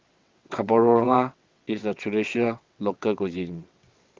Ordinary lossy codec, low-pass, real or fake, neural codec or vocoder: Opus, 32 kbps; 7.2 kHz; fake; vocoder, 22.05 kHz, 80 mel bands, WaveNeXt